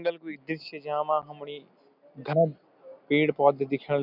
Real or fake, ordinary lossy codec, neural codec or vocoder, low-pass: real; none; none; 5.4 kHz